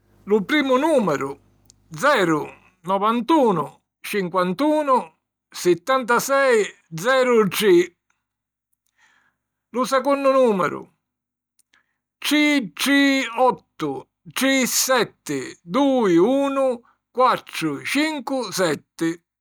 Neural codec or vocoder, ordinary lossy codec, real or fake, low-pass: none; none; real; none